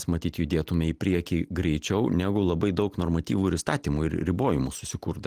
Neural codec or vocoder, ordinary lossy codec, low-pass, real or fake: none; Opus, 24 kbps; 14.4 kHz; real